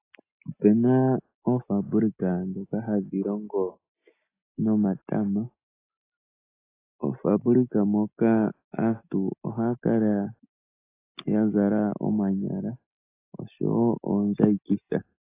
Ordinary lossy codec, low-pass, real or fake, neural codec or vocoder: AAC, 24 kbps; 3.6 kHz; real; none